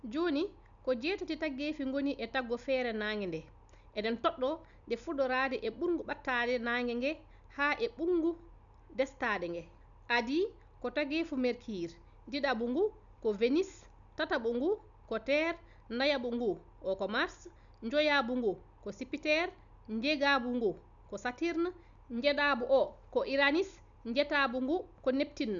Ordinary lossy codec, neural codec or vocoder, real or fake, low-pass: none; none; real; 7.2 kHz